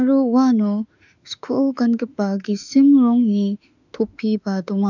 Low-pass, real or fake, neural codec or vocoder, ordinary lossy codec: 7.2 kHz; fake; autoencoder, 48 kHz, 32 numbers a frame, DAC-VAE, trained on Japanese speech; none